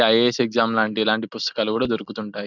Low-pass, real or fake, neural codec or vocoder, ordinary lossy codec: 7.2 kHz; real; none; none